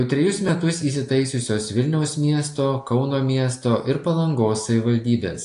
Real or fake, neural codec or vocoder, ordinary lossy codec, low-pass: real; none; AAC, 48 kbps; 10.8 kHz